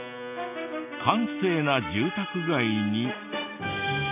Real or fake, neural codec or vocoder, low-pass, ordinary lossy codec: real; none; 3.6 kHz; AAC, 32 kbps